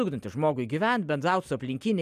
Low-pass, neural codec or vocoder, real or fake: 14.4 kHz; none; real